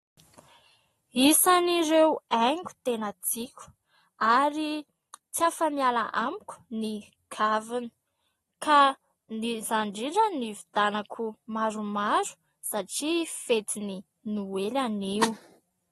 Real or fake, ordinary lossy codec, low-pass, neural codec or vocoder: real; AAC, 32 kbps; 19.8 kHz; none